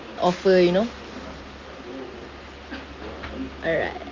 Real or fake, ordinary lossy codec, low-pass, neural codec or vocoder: real; Opus, 32 kbps; 7.2 kHz; none